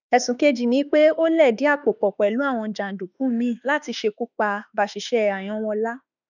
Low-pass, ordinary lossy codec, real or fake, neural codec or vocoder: 7.2 kHz; none; fake; autoencoder, 48 kHz, 32 numbers a frame, DAC-VAE, trained on Japanese speech